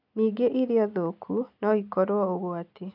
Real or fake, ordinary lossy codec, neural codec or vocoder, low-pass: real; none; none; 5.4 kHz